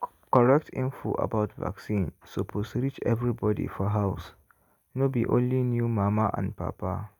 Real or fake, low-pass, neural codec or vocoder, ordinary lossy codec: real; 19.8 kHz; none; none